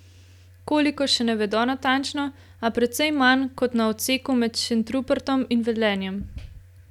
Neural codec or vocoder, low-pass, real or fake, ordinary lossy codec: none; 19.8 kHz; real; none